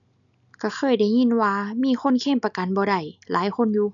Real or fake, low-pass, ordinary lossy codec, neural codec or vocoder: real; 7.2 kHz; MP3, 96 kbps; none